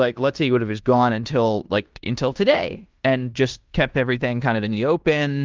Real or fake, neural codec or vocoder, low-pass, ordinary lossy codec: fake; codec, 16 kHz in and 24 kHz out, 0.9 kbps, LongCat-Audio-Codec, fine tuned four codebook decoder; 7.2 kHz; Opus, 24 kbps